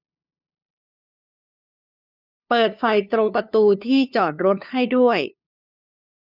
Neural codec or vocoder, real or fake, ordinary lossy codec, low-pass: codec, 16 kHz, 2 kbps, FunCodec, trained on LibriTTS, 25 frames a second; fake; none; 5.4 kHz